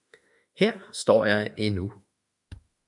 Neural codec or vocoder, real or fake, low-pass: autoencoder, 48 kHz, 32 numbers a frame, DAC-VAE, trained on Japanese speech; fake; 10.8 kHz